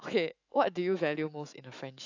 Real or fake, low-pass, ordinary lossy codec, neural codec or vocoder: fake; 7.2 kHz; AAC, 48 kbps; autoencoder, 48 kHz, 128 numbers a frame, DAC-VAE, trained on Japanese speech